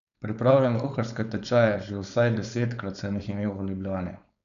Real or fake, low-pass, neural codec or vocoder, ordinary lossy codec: fake; 7.2 kHz; codec, 16 kHz, 4.8 kbps, FACodec; none